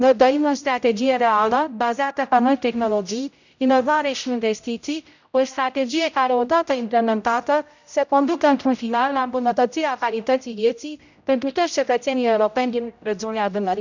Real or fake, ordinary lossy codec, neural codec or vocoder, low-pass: fake; none; codec, 16 kHz, 0.5 kbps, X-Codec, HuBERT features, trained on general audio; 7.2 kHz